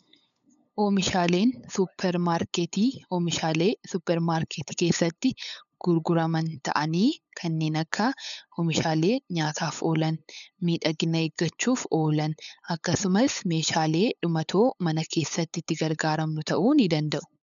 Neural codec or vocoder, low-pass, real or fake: codec, 16 kHz, 8 kbps, FunCodec, trained on LibriTTS, 25 frames a second; 7.2 kHz; fake